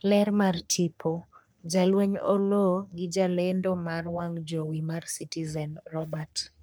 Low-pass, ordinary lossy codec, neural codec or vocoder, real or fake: none; none; codec, 44.1 kHz, 3.4 kbps, Pupu-Codec; fake